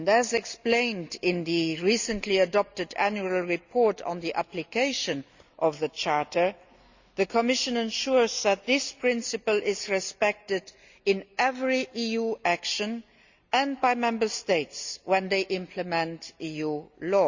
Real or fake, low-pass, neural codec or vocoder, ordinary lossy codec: real; 7.2 kHz; none; Opus, 64 kbps